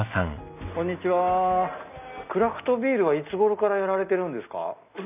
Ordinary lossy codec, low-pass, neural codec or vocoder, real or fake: none; 3.6 kHz; none; real